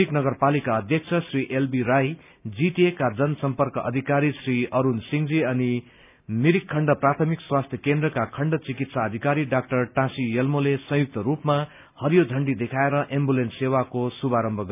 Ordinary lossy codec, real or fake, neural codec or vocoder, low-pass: none; real; none; 3.6 kHz